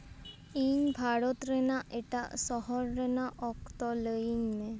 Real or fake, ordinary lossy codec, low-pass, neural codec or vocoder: real; none; none; none